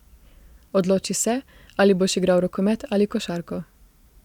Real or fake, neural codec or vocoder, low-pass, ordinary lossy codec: fake; vocoder, 44.1 kHz, 128 mel bands every 512 samples, BigVGAN v2; 19.8 kHz; none